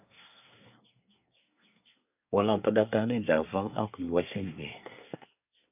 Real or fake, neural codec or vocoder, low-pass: fake; codec, 24 kHz, 1 kbps, SNAC; 3.6 kHz